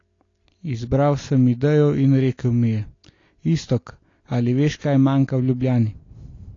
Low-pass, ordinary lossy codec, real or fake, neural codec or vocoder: 7.2 kHz; AAC, 32 kbps; real; none